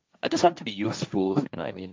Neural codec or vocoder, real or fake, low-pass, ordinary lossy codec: codec, 16 kHz, 1.1 kbps, Voila-Tokenizer; fake; none; none